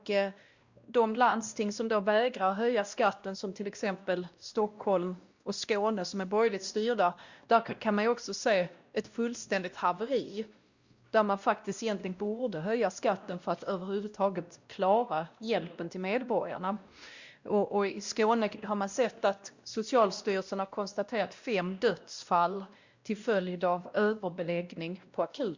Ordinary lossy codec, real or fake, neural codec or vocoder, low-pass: Opus, 64 kbps; fake; codec, 16 kHz, 1 kbps, X-Codec, WavLM features, trained on Multilingual LibriSpeech; 7.2 kHz